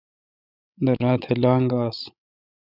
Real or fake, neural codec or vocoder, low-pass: fake; codec, 16 kHz, 16 kbps, FreqCodec, larger model; 5.4 kHz